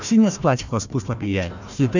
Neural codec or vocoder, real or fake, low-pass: codec, 16 kHz, 1 kbps, FunCodec, trained on Chinese and English, 50 frames a second; fake; 7.2 kHz